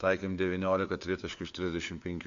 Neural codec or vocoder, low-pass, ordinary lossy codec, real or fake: codec, 16 kHz, 4 kbps, FunCodec, trained on LibriTTS, 50 frames a second; 7.2 kHz; MP3, 48 kbps; fake